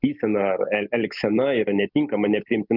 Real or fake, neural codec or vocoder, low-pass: real; none; 5.4 kHz